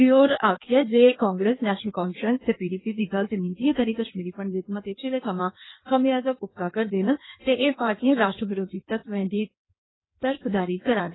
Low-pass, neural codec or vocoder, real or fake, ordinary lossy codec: 7.2 kHz; codec, 16 kHz in and 24 kHz out, 1.1 kbps, FireRedTTS-2 codec; fake; AAC, 16 kbps